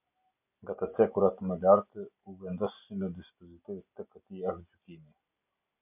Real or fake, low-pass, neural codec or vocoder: real; 3.6 kHz; none